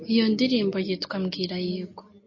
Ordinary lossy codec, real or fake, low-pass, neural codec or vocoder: MP3, 32 kbps; real; 7.2 kHz; none